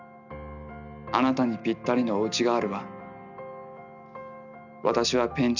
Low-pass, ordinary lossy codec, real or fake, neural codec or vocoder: 7.2 kHz; none; real; none